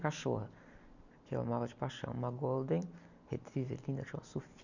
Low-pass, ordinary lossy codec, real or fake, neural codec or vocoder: 7.2 kHz; none; real; none